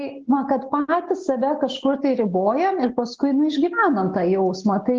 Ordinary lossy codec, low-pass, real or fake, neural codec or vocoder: Opus, 16 kbps; 7.2 kHz; real; none